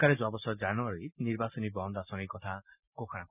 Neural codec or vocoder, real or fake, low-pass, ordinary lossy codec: none; real; 3.6 kHz; none